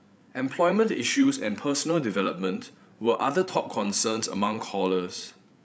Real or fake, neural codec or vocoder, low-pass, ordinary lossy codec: fake; codec, 16 kHz, 8 kbps, FreqCodec, larger model; none; none